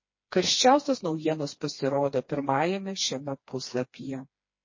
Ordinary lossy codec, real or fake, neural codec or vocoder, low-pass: MP3, 32 kbps; fake; codec, 16 kHz, 2 kbps, FreqCodec, smaller model; 7.2 kHz